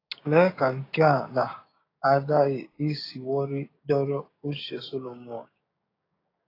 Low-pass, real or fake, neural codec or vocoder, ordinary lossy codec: 5.4 kHz; fake; codec, 16 kHz, 6 kbps, DAC; AAC, 24 kbps